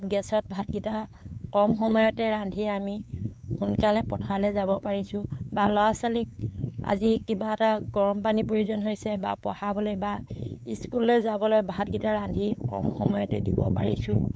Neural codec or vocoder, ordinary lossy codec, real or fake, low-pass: codec, 16 kHz, 4 kbps, X-Codec, WavLM features, trained on Multilingual LibriSpeech; none; fake; none